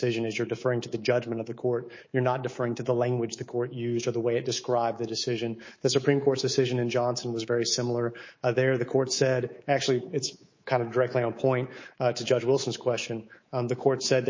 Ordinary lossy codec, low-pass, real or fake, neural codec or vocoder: MP3, 32 kbps; 7.2 kHz; fake; codec, 24 kHz, 3.1 kbps, DualCodec